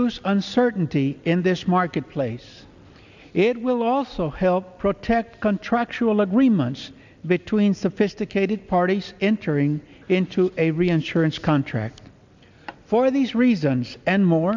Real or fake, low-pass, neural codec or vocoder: real; 7.2 kHz; none